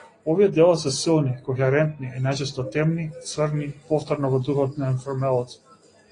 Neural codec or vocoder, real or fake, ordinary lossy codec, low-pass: none; real; AAC, 32 kbps; 9.9 kHz